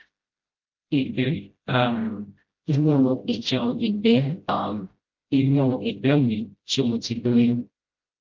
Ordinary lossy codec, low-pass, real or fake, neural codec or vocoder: Opus, 24 kbps; 7.2 kHz; fake; codec, 16 kHz, 0.5 kbps, FreqCodec, smaller model